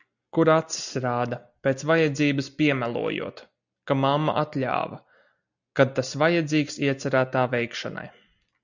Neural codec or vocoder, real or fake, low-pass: none; real; 7.2 kHz